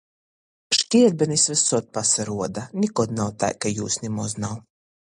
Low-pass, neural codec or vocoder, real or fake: 10.8 kHz; none; real